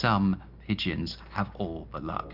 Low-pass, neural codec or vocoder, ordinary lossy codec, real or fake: 5.4 kHz; none; Opus, 64 kbps; real